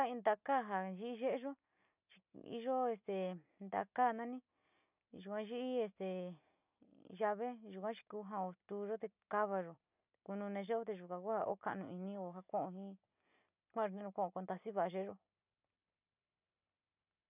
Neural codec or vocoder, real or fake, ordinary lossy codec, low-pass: none; real; none; 3.6 kHz